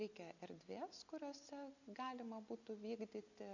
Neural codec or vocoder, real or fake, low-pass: none; real; 7.2 kHz